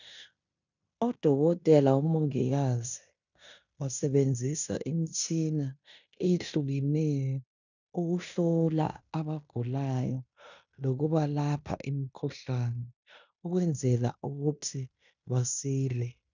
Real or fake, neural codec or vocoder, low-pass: fake; codec, 16 kHz in and 24 kHz out, 0.9 kbps, LongCat-Audio-Codec, fine tuned four codebook decoder; 7.2 kHz